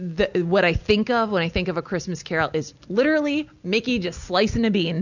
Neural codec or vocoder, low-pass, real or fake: none; 7.2 kHz; real